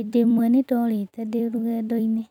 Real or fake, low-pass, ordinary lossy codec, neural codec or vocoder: fake; 19.8 kHz; none; vocoder, 44.1 kHz, 128 mel bands every 256 samples, BigVGAN v2